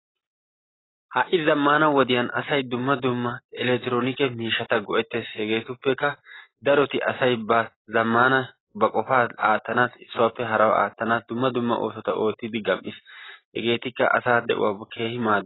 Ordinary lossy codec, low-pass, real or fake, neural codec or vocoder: AAC, 16 kbps; 7.2 kHz; real; none